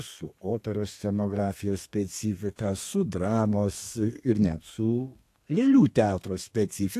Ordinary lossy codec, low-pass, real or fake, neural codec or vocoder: AAC, 64 kbps; 14.4 kHz; fake; codec, 32 kHz, 1.9 kbps, SNAC